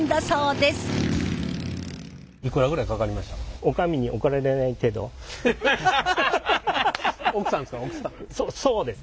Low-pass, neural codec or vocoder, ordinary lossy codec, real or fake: none; none; none; real